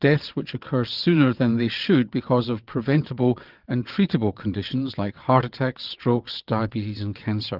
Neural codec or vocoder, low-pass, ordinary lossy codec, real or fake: vocoder, 22.05 kHz, 80 mel bands, WaveNeXt; 5.4 kHz; Opus, 32 kbps; fake